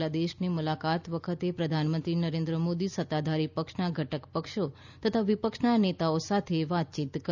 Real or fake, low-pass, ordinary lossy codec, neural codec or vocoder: real; 7.2 kHz; none; none